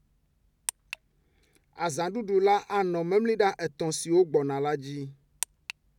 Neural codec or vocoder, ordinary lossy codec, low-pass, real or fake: none; none; 19.8 kHz; real